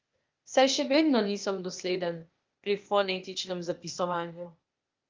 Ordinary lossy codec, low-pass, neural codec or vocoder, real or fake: Opus, 24 kbps; 7.2 kHz; codec, 16 kHz, 0.8 kbps, ZipCodec; fake